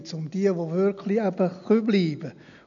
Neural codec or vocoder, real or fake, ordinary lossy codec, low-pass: none; real; none; 7.2 kHz